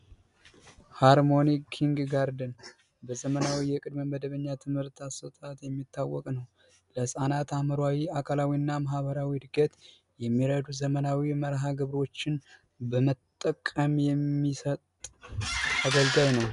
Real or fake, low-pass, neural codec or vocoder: real; 10.8 kHz; none